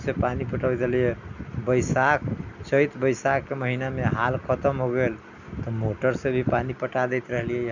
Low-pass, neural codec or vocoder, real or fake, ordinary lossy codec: 7.2 kHz; none; real; none